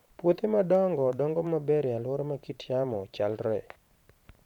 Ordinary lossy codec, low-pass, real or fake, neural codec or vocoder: none; 19.8 kHz; fake; vocoder, 44.1 kHz, 128 mel bands every 512 samples, BigVGAN v2